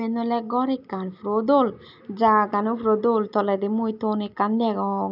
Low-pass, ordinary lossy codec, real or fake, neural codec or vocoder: 5.4 kHz; none; real; none